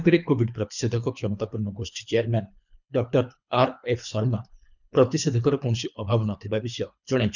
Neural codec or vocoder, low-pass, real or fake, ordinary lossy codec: codec, 24 kHz, 3 kbps, HILCodec; 7.2 kHz; fake; none